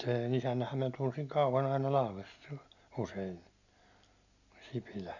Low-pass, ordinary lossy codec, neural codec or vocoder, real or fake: 7.2 kHz; none; none; real